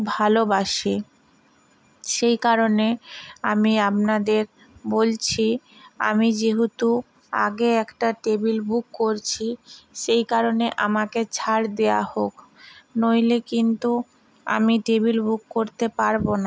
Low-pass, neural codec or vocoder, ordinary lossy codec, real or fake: none; none; none; real